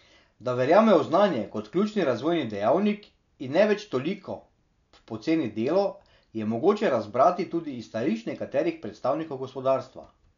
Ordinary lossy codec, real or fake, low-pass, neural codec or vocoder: none; real; 7.2 kHz; none